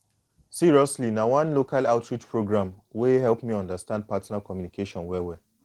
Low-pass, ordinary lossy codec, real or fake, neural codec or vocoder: 19.8 kHz; Opus, 16 kbps; real; none